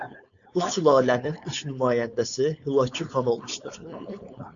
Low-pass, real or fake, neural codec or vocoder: 7.2 kHz; fake; codec, 16 kHz, 4.8 kbps, FACodec